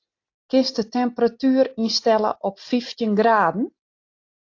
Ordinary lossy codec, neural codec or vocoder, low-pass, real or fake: AAC, 48 kbps; none; 7.2 kHz; real